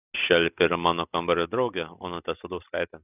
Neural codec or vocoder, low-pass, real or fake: none; 3.6 kHz; real